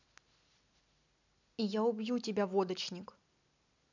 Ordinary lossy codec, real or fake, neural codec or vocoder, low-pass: none; real; none; 7.2 kHz